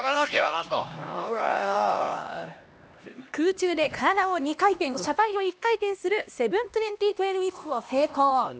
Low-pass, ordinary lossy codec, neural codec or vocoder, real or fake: none; none; codec, 16 kHz, 1 kbps, X-Codec, HuBERT features, trained on LibriSpeech; fake